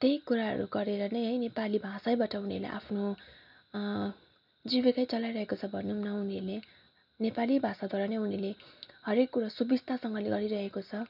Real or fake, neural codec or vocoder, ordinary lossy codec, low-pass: real; none; none; 5.4 kHz